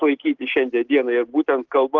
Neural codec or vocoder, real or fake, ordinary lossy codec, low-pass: none; real; Opus, 16 kbps; 7.2 kHz